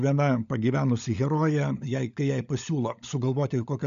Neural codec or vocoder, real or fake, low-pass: codec, 16 kHz, 16 kbps, FunCodec, trained on LibriTTS, 50 frames a second; fake; 7.2 kHz